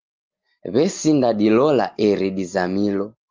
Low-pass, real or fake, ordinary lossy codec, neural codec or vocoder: 7.2 kHz; real; Opus, 32 kbps; none